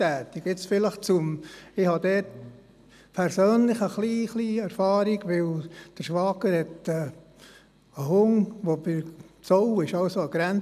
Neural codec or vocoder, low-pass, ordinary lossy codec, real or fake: none; 14.4 kHz; none; real